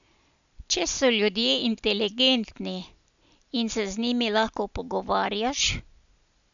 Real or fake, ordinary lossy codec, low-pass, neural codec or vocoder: real; none; 7.2 kHz; none